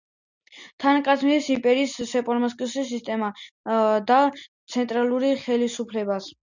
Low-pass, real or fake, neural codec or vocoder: 7.2 kHz; real; none